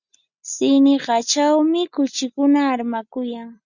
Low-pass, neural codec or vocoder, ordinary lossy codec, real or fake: 7.2 kHz; none; Opus, 64 kbps; real